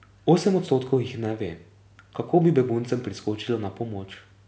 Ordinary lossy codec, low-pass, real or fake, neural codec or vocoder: none; none; real; none